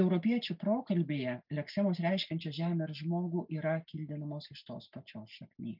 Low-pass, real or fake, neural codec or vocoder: 5.4 kHz; real; none